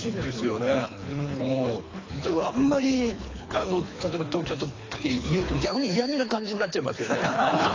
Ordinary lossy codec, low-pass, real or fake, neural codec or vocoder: AAC, 32 kbps; 7.2 kHz; fake; codec, 24 kHz, 3 kbps, HILCodec